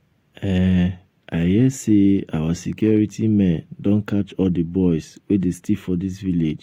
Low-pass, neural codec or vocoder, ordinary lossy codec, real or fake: 19.8 kHz; none; AAC, 48 kbps; real